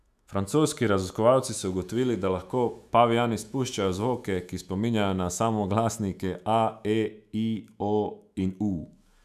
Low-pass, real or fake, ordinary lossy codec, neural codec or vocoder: 14.4 kHz; fake; none; autoencoder, 48 kHz, 128 numbers a frame, DAC-VAE, trained on Japanese speech